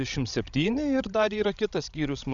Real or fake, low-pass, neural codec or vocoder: fake; 7.2 kHz; codec, 16 kHz, 16 kbps, FreqCodec, larger model